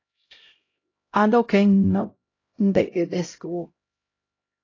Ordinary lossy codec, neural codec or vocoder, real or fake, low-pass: AAC, 32 kbps; codec, 16 kHz, 0.5 kbps, X-Codec, HuBERT features, trained on LibriSpeech; fake; 7.2 kHz